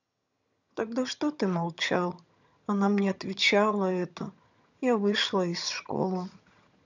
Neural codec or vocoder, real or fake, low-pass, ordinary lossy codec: vocoder, 22.05 kHz, 80 mel bands, HiFi-GAN; fake; 7.2 kHz; none